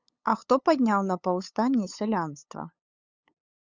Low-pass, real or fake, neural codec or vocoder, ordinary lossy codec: 7.2 kHz; fake; codec, 16 kHz, 8 kbps, FunCodec, trained on LibriTTS, 25 frames a second; Opus, 64 kbps